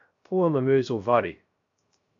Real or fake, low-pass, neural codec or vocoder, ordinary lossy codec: fake; 7.2 kHz; codec, 16 kHz, 0.3 kbps, FocalCodec; AAC, 64 kbps